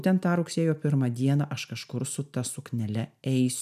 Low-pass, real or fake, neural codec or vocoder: 14.4 kHz; real; none